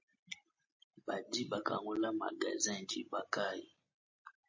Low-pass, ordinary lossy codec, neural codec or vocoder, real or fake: 7.2 kHz; MP3, 32 kbps; none; real